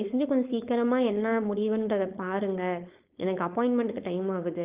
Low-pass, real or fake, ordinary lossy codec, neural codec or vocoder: 3.6 kHz; fake; Opus, 24 kbps; codec, 16 kHz, 4.8 kbps, FACodec